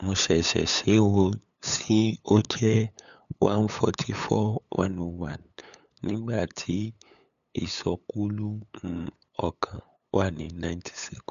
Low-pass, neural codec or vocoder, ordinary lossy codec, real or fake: 7.2 kHz; codec, 16 kHz, 8 kbps, FunCodec, trained on LibriTTS, 25 frames a second; none; fake